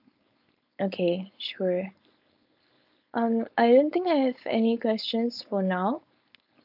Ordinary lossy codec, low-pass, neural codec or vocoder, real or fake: none; 5.4 kHz; codec, 16 kHz, 4.8 kbps, FACodec; fake